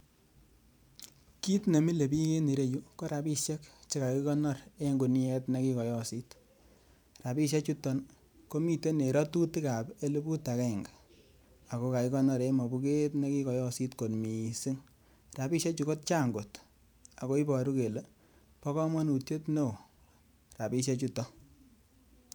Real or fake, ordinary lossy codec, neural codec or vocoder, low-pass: fake; none; vocoder, 44.1 kHz, 128 mel bands every 512 samples, BigVGAN v2; none